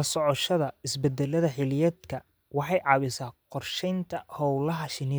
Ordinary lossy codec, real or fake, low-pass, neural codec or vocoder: none; real; none; none